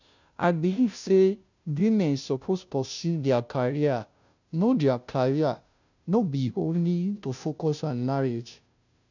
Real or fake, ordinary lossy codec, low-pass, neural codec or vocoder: fake; none; 7.2 kHz; codec, 16 kHz, 0.5 kbps, FunCodec, trained on Chinese and English, 25 frames a second